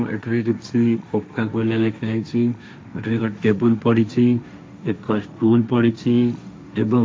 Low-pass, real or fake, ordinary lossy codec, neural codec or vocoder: none; fake; none; codec, 16 kHz, 1.1 kbps, Voila-Tokenizer